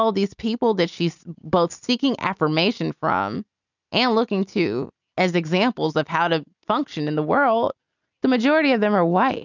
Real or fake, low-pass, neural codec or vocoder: real; 7.2 kHz; none